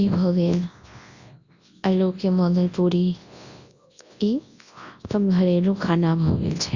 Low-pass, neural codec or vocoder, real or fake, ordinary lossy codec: 7.2 kHz; codec, 24 kHz, 0.9 kbps, WavTokenizer, large speech release; fake; Opus, 64 kbps